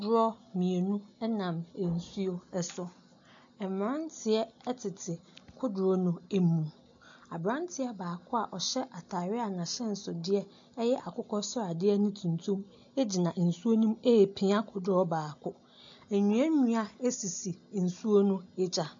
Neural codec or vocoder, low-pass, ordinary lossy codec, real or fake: none; 7.2 kHz; AAC, 64 kbps; real